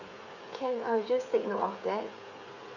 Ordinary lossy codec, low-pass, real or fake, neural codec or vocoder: none; 7.2 kHz; fake; codec, 16 kHz, 8 kbps, FreqCodec, smaller model